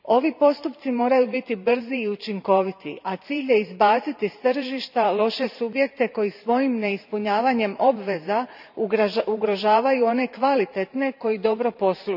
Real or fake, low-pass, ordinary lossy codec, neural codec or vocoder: fake; 5.4 kHz; none; vocoder, 44.1 kHz, 128 mel bands every 512 samples, BigVGAN v2